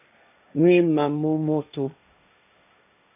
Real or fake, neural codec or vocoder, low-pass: fake; codec, 16 kHz, 1.1 kbps, Voila-Tokenizer; 3.6 kHz